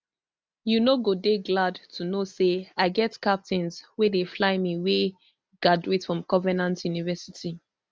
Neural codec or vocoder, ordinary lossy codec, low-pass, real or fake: none; none; none; real